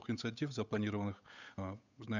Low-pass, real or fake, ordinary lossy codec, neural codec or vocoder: 7.2 kHz; fake; none; codec, 16 kHz, 8 kbps, FunCodec, trained on Chinese and English, 25 frames a second